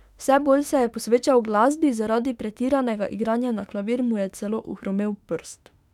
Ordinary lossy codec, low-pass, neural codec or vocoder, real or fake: none; 19.8 kHz; autoencoder, 48 kHz, 32 numbers a frame, DAC-VAE, trained on Japanese speech; fake